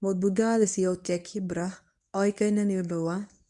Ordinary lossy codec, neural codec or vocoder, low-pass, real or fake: none; codec, 24 kHz, 0.9 kbps, WavTokenizer, medium speech release version 1; 10.8 kHz; fake